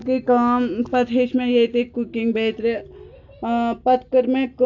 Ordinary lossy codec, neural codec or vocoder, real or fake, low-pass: AAC, 48 kbps; none; real; 7.2 kHz